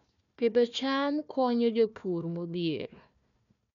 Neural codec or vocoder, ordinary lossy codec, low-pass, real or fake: codec, 16 kHz, 1 kbps, FunCodec, trained on Chinese and English, 50 frames a second; none; 7.2 kHz; fake